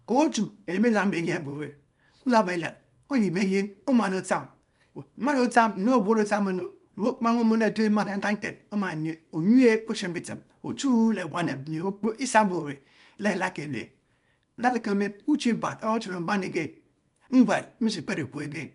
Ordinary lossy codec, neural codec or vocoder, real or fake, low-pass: none; codec, 24 kHz, 0.9 kbps, WavTokenizer, small release; fake; 10.8 kHz